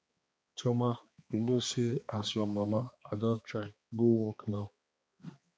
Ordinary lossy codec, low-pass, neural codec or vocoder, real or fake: none; none; codec, 16 kHz, 2 kbps, X-Codec, HuBERT features, trained on balanced general audio; fake